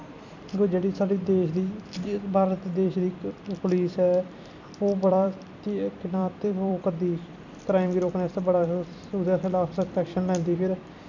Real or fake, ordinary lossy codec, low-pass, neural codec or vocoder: fake; none; 7.2 kHz; vocoder, 44.1 kHz, 128 mel bands every 256 samples, BigVGAN v2